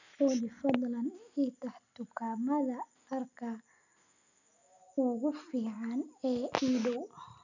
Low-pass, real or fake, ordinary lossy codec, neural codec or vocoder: 7.2 kHz; real; none; none